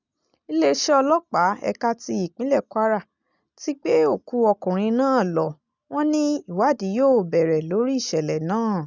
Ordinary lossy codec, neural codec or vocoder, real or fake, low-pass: none; none; real; 7.2 kHz